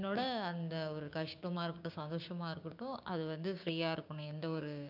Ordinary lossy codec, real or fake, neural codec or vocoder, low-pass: none; fake; codec, 16 kHz, 6 kbps, DAC; 5.4 kHz